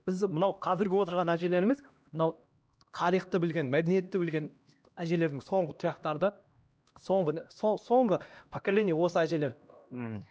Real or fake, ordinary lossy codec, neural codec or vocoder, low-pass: fake; none; codec, 16 kHz, 1 kbps, X-Codec, HuBERT features, trained on LibriSpeech; none